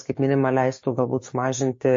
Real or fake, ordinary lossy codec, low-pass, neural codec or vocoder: fake; MP3, 32 kbps; 7.2 kHz; codec, 16 kHz, 6 kbps, DAC